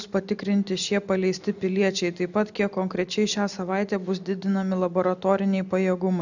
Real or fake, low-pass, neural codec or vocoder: real; 7.2 kHz; none